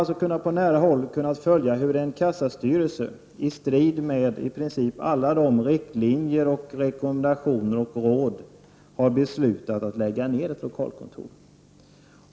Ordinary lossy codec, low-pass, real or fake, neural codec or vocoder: none; none; real; none